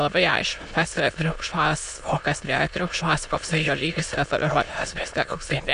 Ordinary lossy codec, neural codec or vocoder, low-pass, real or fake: MP3, 64 kbps; autoencoder, 22.05 kHz, a latent of 192 numbers a frame, VITS, trained on many speakers; 9.9 kHz; fake